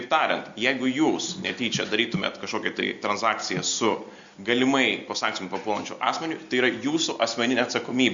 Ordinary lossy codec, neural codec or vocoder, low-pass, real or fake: Opus, 64 kbps; none; 7.2 kHz; real